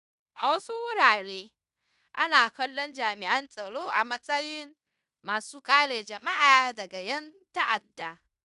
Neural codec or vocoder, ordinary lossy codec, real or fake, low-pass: codec, 16 kHz in and 24 kHz out, 0.9 kbps, LongCat-Audio-Codec, fine tuned four codebook decoder; none; fake; 10.8 kHz